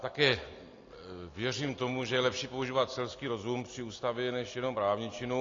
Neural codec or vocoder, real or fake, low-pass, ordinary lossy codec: none; real; 7.2 kHz; AAC, 32 kbps